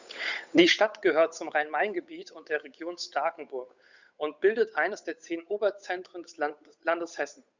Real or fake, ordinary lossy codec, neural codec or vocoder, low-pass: fake; Opus, 64 kbps; codec, 16 kHz, 8 kbps, FunCodec, trained on Chinese and English, 25 frames a second; 7.2 kHz